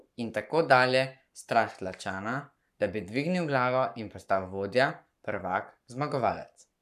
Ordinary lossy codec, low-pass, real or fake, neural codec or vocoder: none; 14.4 kHz; fake; codec, 44.1 kHz, 7.8 kbps, Pupu-Codec